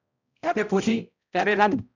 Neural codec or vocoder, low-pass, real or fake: codec, 16 kHz, 0.5 kbps, X-Codec, HuBERT features, trained on balanced general audio; 7.2 kHz; fake